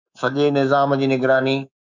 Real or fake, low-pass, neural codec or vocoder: fake; 7.2 kHz; codec, 24 kHz, 3.1 kbps, DualCodec